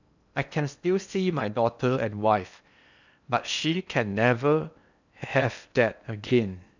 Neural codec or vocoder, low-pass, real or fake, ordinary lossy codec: codec, 16 kHz in and 24 kHz out, 0.8 kbps, FocalCodec, streaming, 65536 codes; 7.2 kHz; fake; none